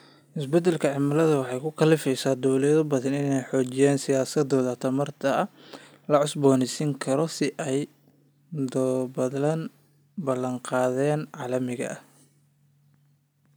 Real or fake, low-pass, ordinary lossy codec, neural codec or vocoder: real; none; none; none